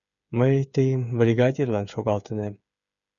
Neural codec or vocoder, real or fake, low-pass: codec, 16 kHz, 8 kbps, FreqCodec, smaller model; fake; 7.2 kHz